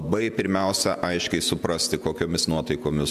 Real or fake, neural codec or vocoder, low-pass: fake; vocoder, 48 kHz, 128 mel bands, Vocos; 14.4 kHz